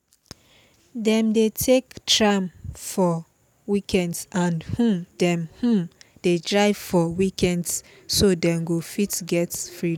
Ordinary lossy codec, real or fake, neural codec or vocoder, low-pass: none; real; none; 19.8 kHz